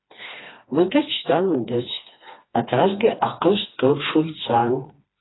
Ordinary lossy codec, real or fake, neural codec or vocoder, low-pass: AAC, 16 kbps; fake; codec, 16 kHz, 2 kbps, FreqCodec, smaller model; 7.2 kHz